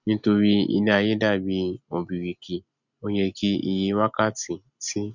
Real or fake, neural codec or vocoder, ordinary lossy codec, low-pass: real; none; none; 7.2 kHz